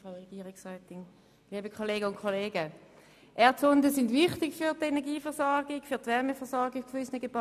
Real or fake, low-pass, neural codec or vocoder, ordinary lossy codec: real; 14.4 kHz; none; none